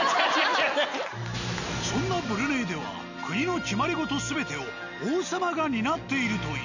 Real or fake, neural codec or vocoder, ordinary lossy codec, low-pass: real; none; none; 7.2 kHz